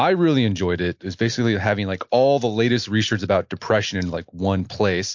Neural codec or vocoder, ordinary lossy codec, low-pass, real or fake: none; MP3, 48 kbps; 7.2 kHz; real